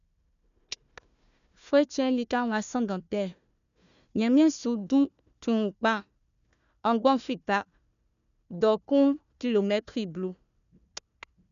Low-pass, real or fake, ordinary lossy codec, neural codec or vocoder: 7.2 kHz; fake; none; codec, 16 kHz, 1 kbps, FunCodec, trained on Chinese and English, 50 frames a second